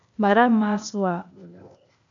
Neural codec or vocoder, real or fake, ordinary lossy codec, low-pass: codec, 16 kHz, 0.8 kbps, ZipCodec; fake; MP3, 96 kbps; 7.2 kHz